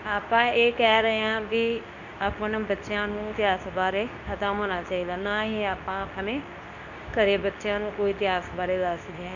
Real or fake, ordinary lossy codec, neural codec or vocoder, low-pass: fake; none; codec, 24 kHz, 0.9 kbps, WavTokenizer, medium speech release version 2; 7.2 kHz